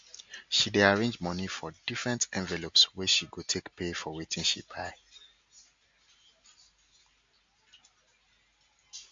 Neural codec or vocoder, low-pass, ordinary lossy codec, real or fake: none; 7.2 kHz; MP3, 48 kbps; real